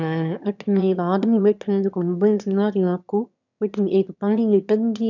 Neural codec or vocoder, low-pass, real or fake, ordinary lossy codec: autoencoder, 22.05 kHz, a latent of 192 numbers a frame, VITS, trained on one speaker; 7.2 kHz; fake; none